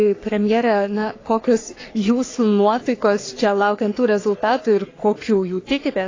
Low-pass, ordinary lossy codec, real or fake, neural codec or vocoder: 7.2 kHz; AAC, 32 kbps; fake; codec, 44.1 kHz, 3.4 kbps, Pupu-Codec